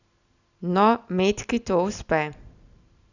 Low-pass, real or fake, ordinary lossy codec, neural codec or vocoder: 7.2 kHz; real; none; none